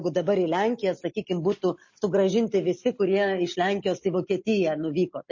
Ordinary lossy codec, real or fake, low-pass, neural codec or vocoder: MP3, 32 kbps; real; 7.2 kHz; none